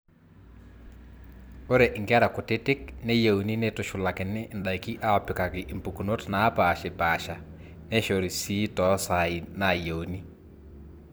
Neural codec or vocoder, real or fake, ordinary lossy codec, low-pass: vocoder, 44.1 kHz, 128 mel bands every 256 samples, BigVGAN v2; fake; none; none